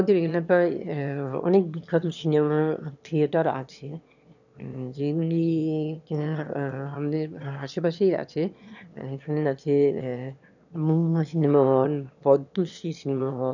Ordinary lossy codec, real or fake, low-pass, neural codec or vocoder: none; fake; 7.2 kHz; autoencoder, 22.05 kHz, a latent of 192 numbers a frame, VITS, trained on one speaker